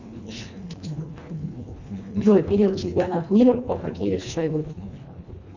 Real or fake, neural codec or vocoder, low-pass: fake; codec, 24 kHz, 1.5 kbps, HILCodec; 7.2 kHz